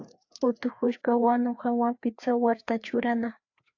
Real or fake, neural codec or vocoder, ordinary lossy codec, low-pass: fake; codec, 16 kHz, 2 kbps, FreqCodec, larger model; AAC, 48 kbps; 7.2 kHz